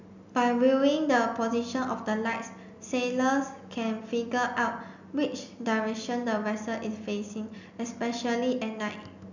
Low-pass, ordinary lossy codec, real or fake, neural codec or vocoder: 7.2 kHz; none; real; none